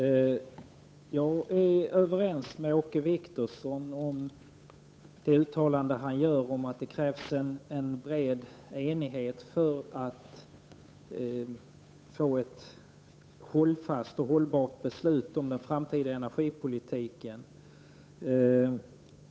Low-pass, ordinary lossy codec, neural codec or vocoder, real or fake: none; none; codec, 16 kHz, 8 kbps, FunCodec, trained on Chinese and English, 25 frames a second; fake